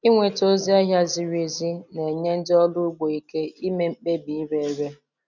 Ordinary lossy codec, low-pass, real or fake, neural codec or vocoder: none; 7.2 kHz; real; none